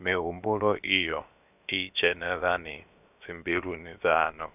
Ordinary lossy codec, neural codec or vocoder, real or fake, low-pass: none; codec, 16 kHz, about 1 kbps, DyCAST, with the encoder's durations; fake; 3.6 kHz